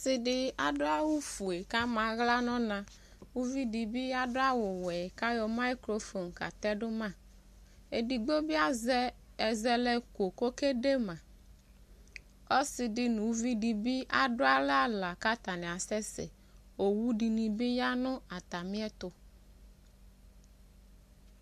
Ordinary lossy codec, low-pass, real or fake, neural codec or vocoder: MP3, 64 kbps; 14.4 kHz; real; none